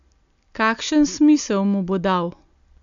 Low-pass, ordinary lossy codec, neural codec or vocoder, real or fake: 7.2 kHz; none; none; real